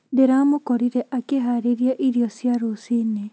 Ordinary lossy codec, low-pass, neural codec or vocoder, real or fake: none; none; none; real